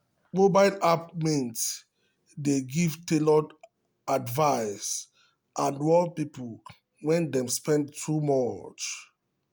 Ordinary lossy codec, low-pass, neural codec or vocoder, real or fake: none; none; none; real